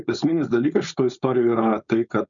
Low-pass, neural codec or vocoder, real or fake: 7.2 kHz; codec, 16 kHz, 4.8 kbps, FACodec; fake